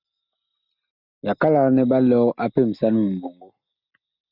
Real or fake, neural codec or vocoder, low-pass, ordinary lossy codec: real; none; 5.4 kHz; Opus, 64 kbps